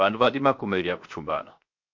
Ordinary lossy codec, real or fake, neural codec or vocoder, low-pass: MP3, 48 kbps; fake; codec, 16 kHz, 0.7 kbps, FocalCodec; 7.2 kHz